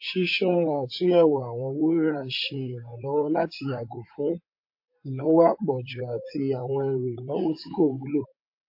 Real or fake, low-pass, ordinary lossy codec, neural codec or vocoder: fake; 5.4 kHz; MP3, 32 kbps; vocoder, 44.1 kHz, 128 mel bands, Pupu-Vocoder